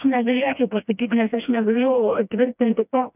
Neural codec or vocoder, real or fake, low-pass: codec, 16 kHz, 1 kbps, FreqCodec, smaller model; fake; 3.6 kHz